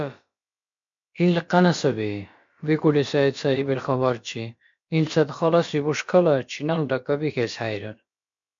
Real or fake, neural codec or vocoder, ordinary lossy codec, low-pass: fake; codec, 16 kHz, about 1 kbps, DyCAST, with the encoder's durations; MP3, 48 kbps; 7.2 kHz